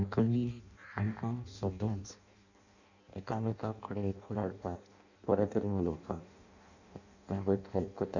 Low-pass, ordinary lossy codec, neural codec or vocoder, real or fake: 7.2 kHz; none; codec, 16 kHz in and 24 kHz out, 0.6 kbps, FireRedTTS-2 codec; fake